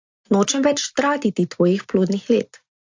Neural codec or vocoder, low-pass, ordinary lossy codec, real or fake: none; 7.2 kHz; AAC, 48 kbps; real